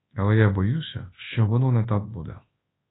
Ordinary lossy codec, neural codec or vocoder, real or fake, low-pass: AAC, 16 kbps; codec, 24 kHz, 0.9 kbps, WavTokenizer, large speech release; fake; 7.2 kHz